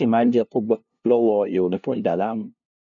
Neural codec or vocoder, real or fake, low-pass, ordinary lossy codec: codec, 16 kHz, 0.5 kbps, FunCodec, trained on LibriTTS, 25 frames a second; fake; 7.2 kHz; none